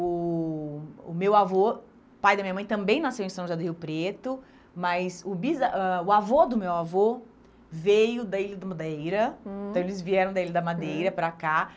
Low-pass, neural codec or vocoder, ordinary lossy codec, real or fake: none; none; none; real